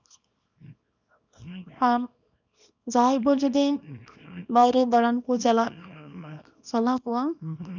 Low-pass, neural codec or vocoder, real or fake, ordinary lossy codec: 7.2 kHz; codec, 24 kHz, 0.9 kbps, WavTokenizer, small release; fake; none